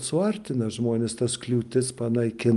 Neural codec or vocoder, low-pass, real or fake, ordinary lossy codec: none; 10.8 kHz; real; Opus, 32 kbps